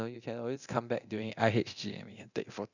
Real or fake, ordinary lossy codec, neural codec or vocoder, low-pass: fake; none; codec, 24 kHz, 0.9 kbps, DualCodec; 7.2 kHz